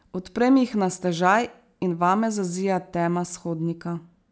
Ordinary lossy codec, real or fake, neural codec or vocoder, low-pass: none; real; none; none